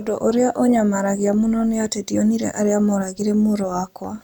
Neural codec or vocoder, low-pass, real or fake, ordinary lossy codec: none; none; real; none